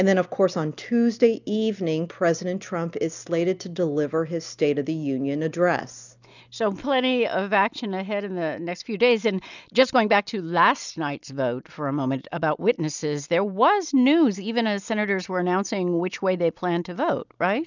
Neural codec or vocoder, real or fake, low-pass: none; real; 7.2 kHz